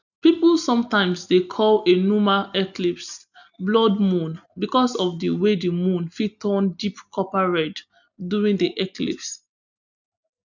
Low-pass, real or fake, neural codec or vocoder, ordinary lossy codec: 7.2 kHz; real; none; none